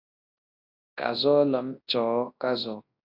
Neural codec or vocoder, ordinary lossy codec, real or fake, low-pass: codec, 24 kHz, 0.9 kbps, WavTokenizer, large speech release; AAC, 32 kbps; fake; 5.4 kHz